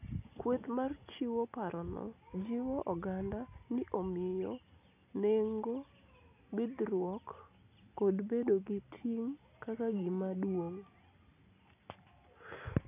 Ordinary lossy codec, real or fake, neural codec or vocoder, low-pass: none; real; none; 3.6 kHz